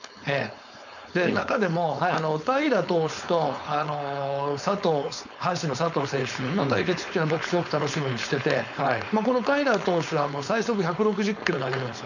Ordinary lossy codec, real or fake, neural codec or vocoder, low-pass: none; fake; codec, 16 kHz, 4.8 kbps, FACodec; 7.2 kHz